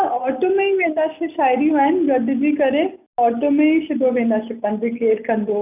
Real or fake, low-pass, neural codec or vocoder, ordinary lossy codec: real; 3.6 kHz; none; none